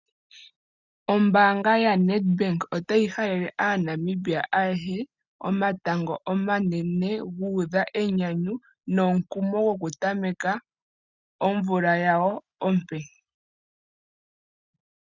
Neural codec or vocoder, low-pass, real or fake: none; 7.2 kHz; real